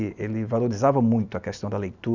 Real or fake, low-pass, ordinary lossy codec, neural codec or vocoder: real; 7.2 kHz; Opus, 64 kbps; none